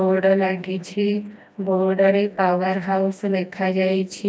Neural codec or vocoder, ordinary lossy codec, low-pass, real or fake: codec, 16 kHz, 1 kbps, FreqCodec, smaller model; none; none; fake